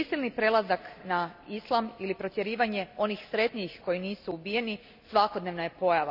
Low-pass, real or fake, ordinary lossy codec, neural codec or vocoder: 5.4 kHz; real; none; none